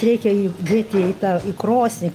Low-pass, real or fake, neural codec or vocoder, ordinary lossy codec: 14.4 kHz; real; none; Opus, 32 kbps